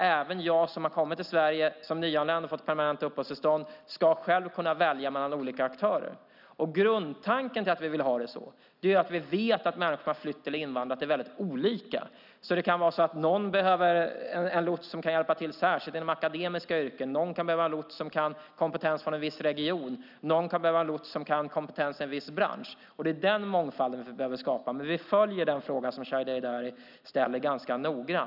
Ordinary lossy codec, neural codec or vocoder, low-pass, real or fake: none; none; 5.4 kHz; real